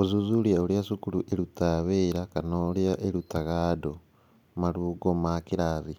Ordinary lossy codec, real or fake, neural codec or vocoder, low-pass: none; real; none; 19.8 kHz